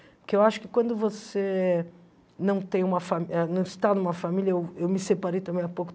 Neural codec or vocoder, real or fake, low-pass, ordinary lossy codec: none; real; none; none